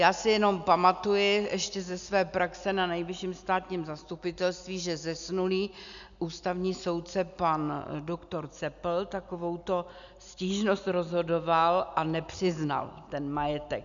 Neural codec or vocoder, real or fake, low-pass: none; real; 7.2 kHz